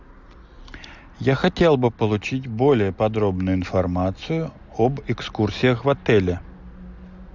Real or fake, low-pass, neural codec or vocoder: real; 7.2 kHz; none